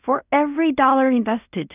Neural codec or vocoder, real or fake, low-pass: codec, 16 kHz in and 24 kHz out, 0.4 kbps, LongCat-Audio-Codec, fine tuned four codebook decoder; fake; 3.6 kHz